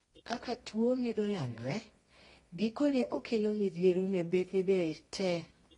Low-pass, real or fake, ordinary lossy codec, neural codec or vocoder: 10.8 kHz; fake; AAC, 32 kbps; codec, 24 kHz, 0.9 kbps, WavTokenizer, medium music audio release